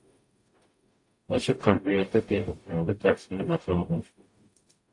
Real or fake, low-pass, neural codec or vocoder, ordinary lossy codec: fake; 10.8 kHz; codec, 44.1 kHz, 0.9 kbps, DAC; AAC, 48 kbps